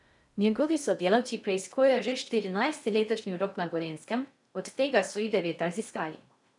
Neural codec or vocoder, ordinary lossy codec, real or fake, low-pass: codec, 16 kHz in and 24 kHz out, 0.6 kbps, FocalCodec, streaming, 2048 codes; none; fake; 10.8 kHz